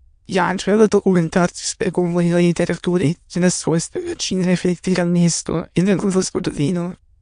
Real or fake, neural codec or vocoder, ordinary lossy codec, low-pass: fake; autoencoder, 22.05 kHz, a latent of 192 numbers a frame, VITS, trained on many speakers; MP3, 64 kbps; 9.9 kHz